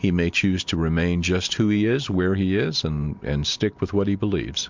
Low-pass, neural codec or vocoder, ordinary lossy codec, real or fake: 7.2 kHz; none; MP3, 64 kbps; real